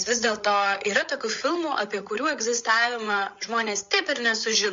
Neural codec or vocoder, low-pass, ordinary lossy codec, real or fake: codec, 16 kHz, 8 kbps, FreqCodec, larger model; 7.2 kHz; MP3, 48 kbps; fake